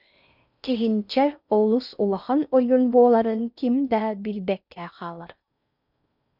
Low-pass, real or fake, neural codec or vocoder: 5.4 kHz; fake; codec, 16 kHz in and 24 kHz out, 0.6 kbps, FocalCodec, streaming, 4096 codes